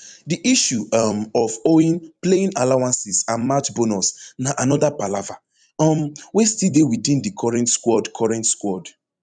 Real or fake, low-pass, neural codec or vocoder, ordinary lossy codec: fake; 9.9 kHz; vocoder, 44.1 kHz, 128 mel bands every 256 samples, BigVGAN v2; none